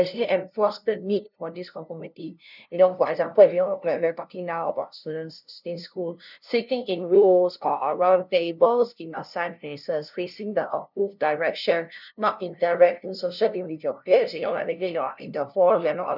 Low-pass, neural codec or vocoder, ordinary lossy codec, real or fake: 5.4 kHz; codec, 16 kHz, 0.5 kbps, FunCodec, trained on LibriTTS, 25 frames a second; none; fake